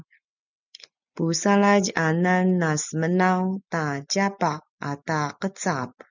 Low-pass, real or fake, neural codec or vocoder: 7.2 kHz; real; none